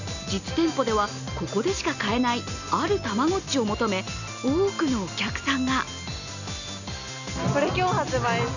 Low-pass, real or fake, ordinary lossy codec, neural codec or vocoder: 7.2 kHz; real; none; none